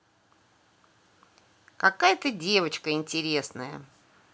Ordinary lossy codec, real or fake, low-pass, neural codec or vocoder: none; real; none; none